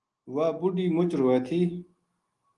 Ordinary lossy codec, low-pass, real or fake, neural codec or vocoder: Opus, 24 kbps; 10.8 kHz; real; none